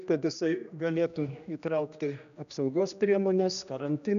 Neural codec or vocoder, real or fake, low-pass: codec, 16 kHz, 1 kbps, X-Codec, HuBERT features, trained on general audio; fake; 7.2 kHz